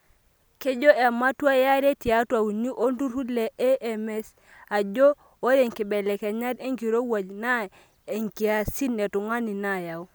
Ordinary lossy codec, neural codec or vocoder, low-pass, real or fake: none; none; none; real